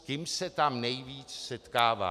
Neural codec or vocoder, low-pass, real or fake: none; 14.4 kHz; real